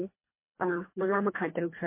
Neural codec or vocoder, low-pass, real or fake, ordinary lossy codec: codec, 24 kHz, 1.5 kbps, HILCodec; 3.6 kHz; fake; MP3, 24 kbps